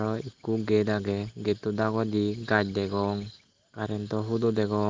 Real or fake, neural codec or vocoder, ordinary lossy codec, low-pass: real; none; Opus, 16 kbps; 7.2 kHz